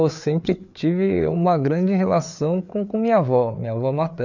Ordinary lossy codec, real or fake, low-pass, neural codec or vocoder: none; fake; 7.2 kHz; codec, 16 kHz, 4 kbps, FreqCodec, larger model